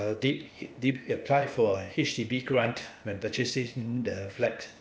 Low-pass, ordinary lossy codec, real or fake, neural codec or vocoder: none; none; fake; codec, 16 kHz, 0.8 kbps, ZipCodec